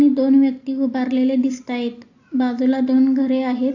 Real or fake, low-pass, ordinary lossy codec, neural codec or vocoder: real; 7.2 kHz; AAC, 48 kbps; none